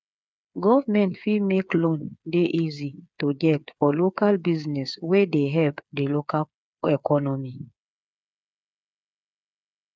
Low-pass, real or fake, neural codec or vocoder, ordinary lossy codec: none; fake; codec, 16 kHz, 8 kbps, FreqCodec, smaller model; none